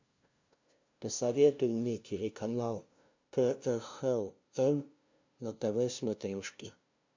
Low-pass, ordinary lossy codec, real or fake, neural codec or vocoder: 7.2 kHz; MP3, 64 kbps; fake; codec, 16 kHz, 0.5 kbps, FunCodec, trained on LibriTTS, 25 frames a second